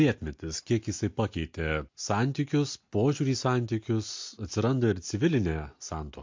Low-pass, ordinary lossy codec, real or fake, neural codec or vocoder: 7.2 kHz; MP3, 48 kbps; real; none